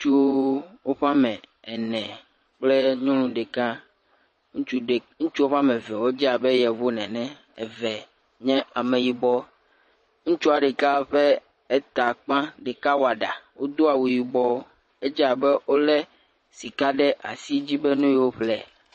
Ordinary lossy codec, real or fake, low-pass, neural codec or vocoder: MP3, 32 kbps; fake; 9.9 kHz; vocoder, 22.05 kHz, 80 mel bands, Vocos